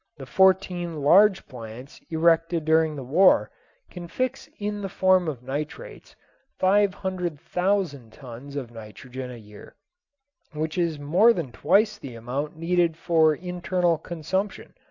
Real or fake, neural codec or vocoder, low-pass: real; none; 7.2 kHz